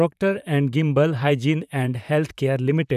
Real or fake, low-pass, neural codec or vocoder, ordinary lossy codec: fake; 14.4 kHz; vocoder, 44.1 kHz, 128 mel bands, Pupu-Vocoder; none